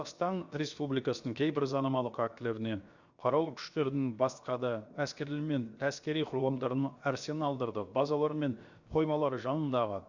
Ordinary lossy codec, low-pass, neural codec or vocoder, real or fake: Opus, 64 kbps; 7.2 kHz; codec, 16 kHz, 0.7 kbps, FocalCodec; fake